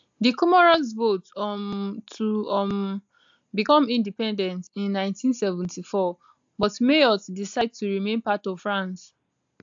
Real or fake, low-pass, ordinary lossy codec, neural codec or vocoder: real; 7.2 kHz; AAC, 64 kbps; none